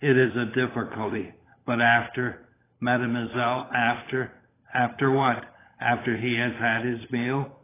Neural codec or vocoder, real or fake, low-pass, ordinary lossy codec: codec, 16 kHz, 16 kbps, FunCodec, trained on LibriTTS, 50 frames a second; fake; 3.6 kHz; AAC, 16 kbps